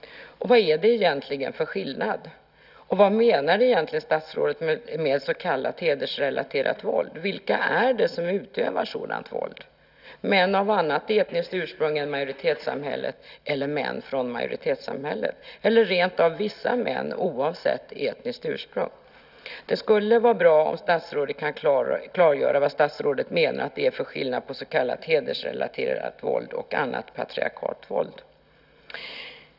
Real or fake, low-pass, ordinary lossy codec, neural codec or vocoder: real; 5.4 kHz; none; none